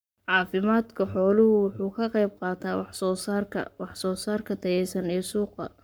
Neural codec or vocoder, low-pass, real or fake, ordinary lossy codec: codec, 44.1 kHz, 7.8 kbps, Pupu-Codec; none; fake; none